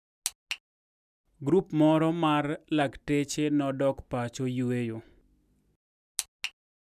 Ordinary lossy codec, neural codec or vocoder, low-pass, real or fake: none; none; 14.4 kHz; real